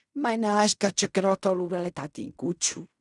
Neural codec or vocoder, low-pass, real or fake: codec, 16 kHz in and 24 kHz out, 0.4 kbps, LongCat-Audio-Codec, fine tuned four codebook decoder; 10.8 kHz; fake